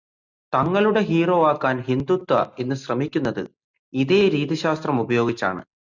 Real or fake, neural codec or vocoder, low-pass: real; none; 7.2 kHz